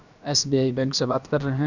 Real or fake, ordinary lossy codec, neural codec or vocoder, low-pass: fake; none; codec, 16 kHz, about 1 kbps, DyCAST, with the encoder's durations; 7.2 kHz